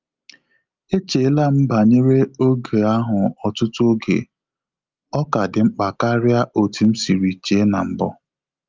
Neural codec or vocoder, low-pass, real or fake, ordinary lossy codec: none; 7.2 kHz; real; Opus, 32 kbps